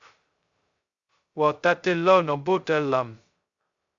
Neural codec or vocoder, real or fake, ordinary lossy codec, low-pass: codec, 16 kHz, 0.2 kbps, FocalCodec; fake; Opus, 64 kbps; 7.2 kHz